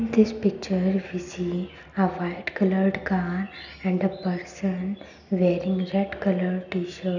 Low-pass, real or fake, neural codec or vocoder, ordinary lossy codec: 7.2 kHz; real; none; none